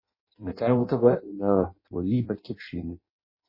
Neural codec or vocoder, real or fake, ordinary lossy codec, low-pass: codec, 16 kHz in and 24 kHz out, 0.6 kbps, FireRedTTS-2 codec; fake; MP3, 24 kbps; 5.4 kHz